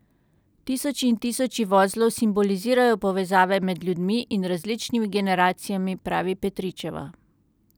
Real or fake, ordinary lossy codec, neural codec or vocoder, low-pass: real; none; none; none